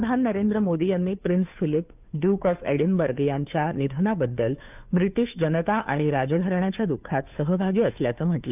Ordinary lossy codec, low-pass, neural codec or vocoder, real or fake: none; 3.6 kHz; codec, 16 kHz, 2 kbps, FunCodec, trained on Chinese and English, 25 frames a second; fake